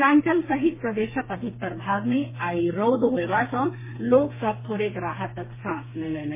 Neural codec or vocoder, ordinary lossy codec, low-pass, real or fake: codec, 32 kHz, 1.9 kbps, SNAC; MP3, 16 kbps; 3.6 kHz; fake